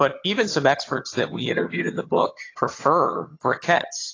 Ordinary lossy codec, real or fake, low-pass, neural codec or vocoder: AAC, 32 kbps; fake; 7.2 kHz; vocoder, 22.05 kHz, 80 mel bands, HiFi-GAN